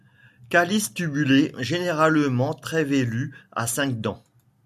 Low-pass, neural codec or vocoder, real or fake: 14.4 kHz; none; real